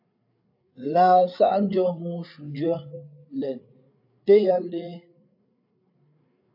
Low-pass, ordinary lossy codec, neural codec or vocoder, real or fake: 5.4 kHz; AAC, 48 kbps; codec, 16 kHz, 8 kbps, FreqCodec, larger model; fake